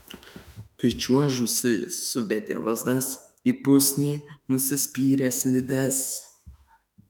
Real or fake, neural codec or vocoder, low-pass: fake; autoencoder, 48 kHz, 32 numbers a frame, DAC-VAE, trained on Japanese speech; 19.8 kHz